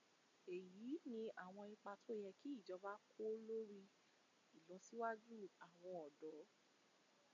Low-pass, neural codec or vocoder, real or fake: 7.2 kHz; none; real